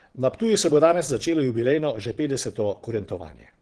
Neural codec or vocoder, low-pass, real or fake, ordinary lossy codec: vocoder, 22.05 kHz, 80 mel bands, Vocos; 9.9 kHz; fake; Opus, 16 kbps